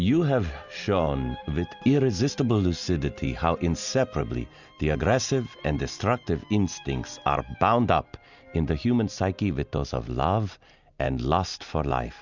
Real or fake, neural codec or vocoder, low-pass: real; none; 7.2 kHz